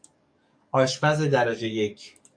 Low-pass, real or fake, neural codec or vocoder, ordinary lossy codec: 9.9 kHz; fake; codec, 44.1 kHz, 7.8 kbps, DAC; AAC, 64 kbps